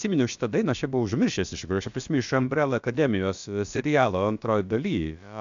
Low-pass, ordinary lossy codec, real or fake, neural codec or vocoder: 7.2 kHz; MP3, 64 kbps; fake; codec, 16 kHz, about 1 kbps, DyCAST, with the encoder's durations